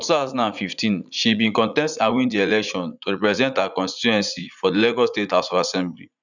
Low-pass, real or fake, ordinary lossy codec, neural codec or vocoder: 7.2 kHz; fake; none; vocoder, 44.1 kHz, 80 mel bands, Vocos